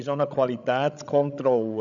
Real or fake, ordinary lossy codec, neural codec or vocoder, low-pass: fake; none; codec, 16 kHz, 16 kbps, FreqCodec, larger model; 7.2 kHz